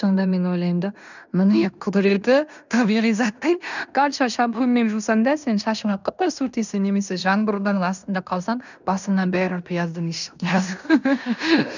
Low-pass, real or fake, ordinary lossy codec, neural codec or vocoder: 7.2 kHz; fake; none; codec, 16 kHz in and 24 kHz out, 0.9 kbps, LongCat-Audio-Codec, fine tuned four codebook decoder